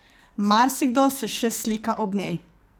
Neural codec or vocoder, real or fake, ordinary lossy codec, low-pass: codec, 44.1 kHz, 2.6 kbps, SNAC; fake; none; none